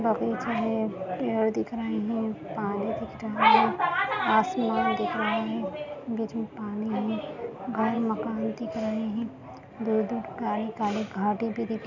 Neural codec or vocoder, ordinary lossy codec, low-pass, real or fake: none; none; 7.2 kHz; real